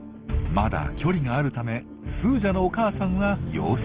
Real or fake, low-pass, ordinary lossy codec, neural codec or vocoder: real; 3.6 kHz; Opus, 16 kbps; none